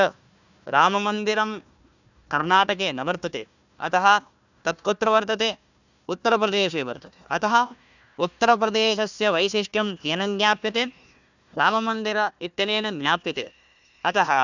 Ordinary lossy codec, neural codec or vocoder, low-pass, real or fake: none; codec, 16 kHz, 1 kbps, FunCodec, trained on Chinese and English, 50 frames a second; 7.2 kHz; fake